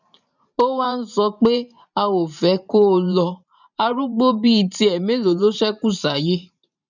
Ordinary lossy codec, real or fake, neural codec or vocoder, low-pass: none; fake; vocoder, 44.1 kHz, 128 mel bands every 512 samples, BigVGAN v2; 7.2 kHz